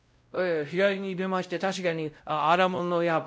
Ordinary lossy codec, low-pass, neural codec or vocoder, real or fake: none; none; codec, 16 kHz, 0.5 kbps, X-Codec, WavLM features, trained on Multilingual LibriSpeech; fake